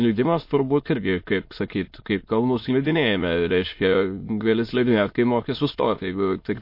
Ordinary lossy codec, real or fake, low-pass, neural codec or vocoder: MP3, 32 kbps; fake; 5.4 kHz; autoencoder, 22.05 kHz, a latent of 192 numbers a frame, VITS, trained on many speakers